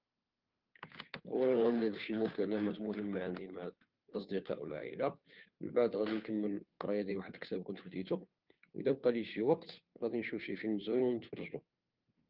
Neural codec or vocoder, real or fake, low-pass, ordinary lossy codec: codec, 16 kHz, 2 kbps, FunCodec, trained on Chinese and English, 25 frames a second; fake; 5.4 kHz; Opus, 32 kbps